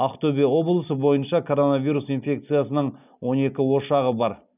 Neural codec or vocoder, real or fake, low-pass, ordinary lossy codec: none; real; 3.6 kHz; none